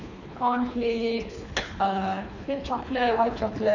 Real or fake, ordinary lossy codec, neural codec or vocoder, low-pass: fake; none; codec, 24 kHz, 3 kbps, HILCodec; 7.2 kHz